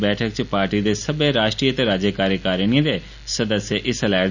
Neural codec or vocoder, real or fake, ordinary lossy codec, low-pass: none; real; none; 7.2 kHz